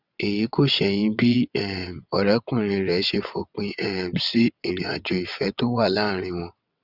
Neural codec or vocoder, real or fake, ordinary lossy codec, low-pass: vocoder, 22.05 kHz, 80 mel bands, WaveNeXt; fake; Opus, 64 kbps; 5.4 kHz